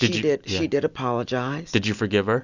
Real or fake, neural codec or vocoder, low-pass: real; none; 7.2 kHz